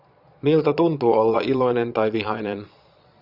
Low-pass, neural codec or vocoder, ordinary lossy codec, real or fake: 5.4 kHz; vocoder, 22.05 kHz, 80 mel bands, Vocos; Opus, 64 kbps; fake